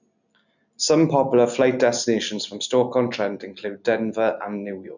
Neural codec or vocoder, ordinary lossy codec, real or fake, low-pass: none; none; real; 7.2 kHz